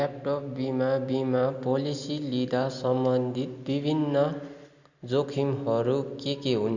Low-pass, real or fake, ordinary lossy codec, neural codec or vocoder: 7.2 kHz; real; Opus, 64 kbps; none